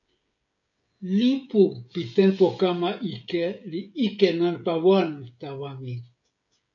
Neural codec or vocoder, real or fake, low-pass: codec, 16 kHz, 16 kbps, FreqCodec, smaller model; fake; 7.2 kHz